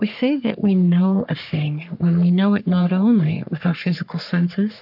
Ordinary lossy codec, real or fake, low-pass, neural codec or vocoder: AAC, 48 kbps; fake; 5.4 kHz; codec, 44.1 kHz, 3.4 kbps, Pupu-Codec